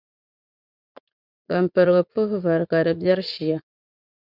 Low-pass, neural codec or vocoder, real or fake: 5.4 kHz; vocoder, 44.1 kHz, 80 mel bands, Vocos; fake